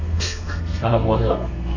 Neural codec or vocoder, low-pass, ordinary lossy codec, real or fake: codec, 32 kHz, 1.9 kbps, SNAC; 7.2 kHz; none; fake